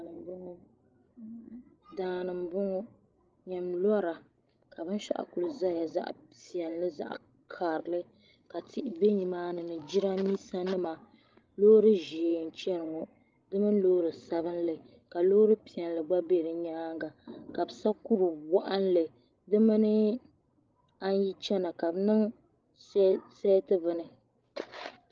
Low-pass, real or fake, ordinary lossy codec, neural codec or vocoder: 7.2 kHz; fake; Opus, 24 kbps; codec, 16 kHz, 16 kbps, FreqCodec, larger model